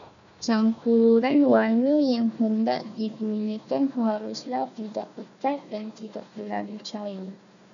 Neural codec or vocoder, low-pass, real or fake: codec, 16 kHz, 1 kbps, FunCodec, trained on Chinese and English, 50 frames a second; 7.2 kHz; fake